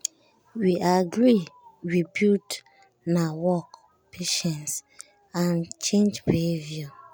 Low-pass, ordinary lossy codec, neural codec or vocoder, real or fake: none; none; none; real